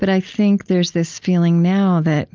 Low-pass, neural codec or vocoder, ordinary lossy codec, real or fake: 7.2 kHz; none; Opus, 24 kbps; real